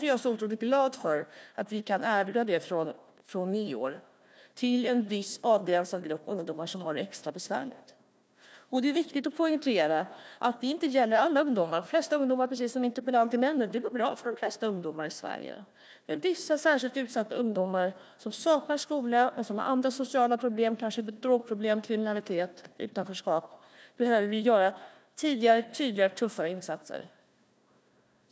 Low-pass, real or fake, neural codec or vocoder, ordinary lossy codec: none; fake; codec, 16 kHz, 1 kbps, FunCodec, trained on Chinese and English, 50 frames a second; none